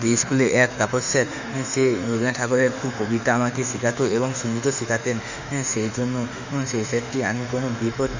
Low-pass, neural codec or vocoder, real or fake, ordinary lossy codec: 7.2 kHz; autoencoder, 48 kHz, 32 numbers a frame, DAC-VAE, trained on Japanese speech; fake; Opus, 64 kbps